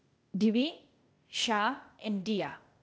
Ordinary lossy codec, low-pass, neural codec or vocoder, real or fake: none; none; codec, 16 kHz, 0.8 kbps, ZipCodec; fake